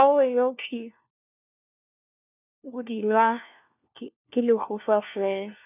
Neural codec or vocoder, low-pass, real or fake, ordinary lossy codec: codec, 16 kHz, 1 kbps, FunCodec, trained on LibriTTS, 50 frames a second; 3.6 kHz; fake; none